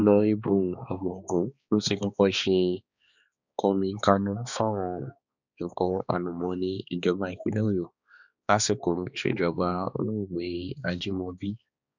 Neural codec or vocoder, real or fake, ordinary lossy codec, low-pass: codec, 16 kHz, 2 kbps, X-Codec, HuBERT features, trained on balanced general audio; fake; none; 7.2 kHz